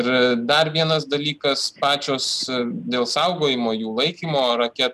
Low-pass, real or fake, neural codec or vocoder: 14.4 kHz; real; none